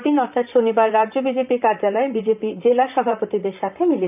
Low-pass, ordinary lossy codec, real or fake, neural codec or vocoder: 3.6 kHz; none; fake; vocoder, 44.1 kHz, 128 mel bands, Pupu-Vocoder